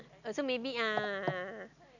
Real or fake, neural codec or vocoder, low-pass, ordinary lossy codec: real; none; 7.2 kHz; none